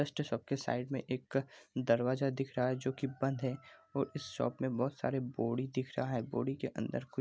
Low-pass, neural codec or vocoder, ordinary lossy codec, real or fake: none; none; none; real